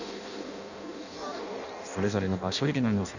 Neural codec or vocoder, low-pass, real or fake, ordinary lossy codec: codec, 16 kHz in and 24 kHz out, 0.6 kbps, FireRedTTS-2 codec; 7.2 kHz; fake; none